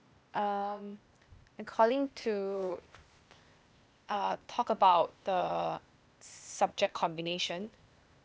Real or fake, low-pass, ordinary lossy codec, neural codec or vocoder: fake; none; none; codec, 16 kHz, 0.8 kbps, ZipCodec